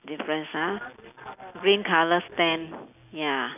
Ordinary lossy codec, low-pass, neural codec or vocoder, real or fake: none; 3.6 kHz; none; real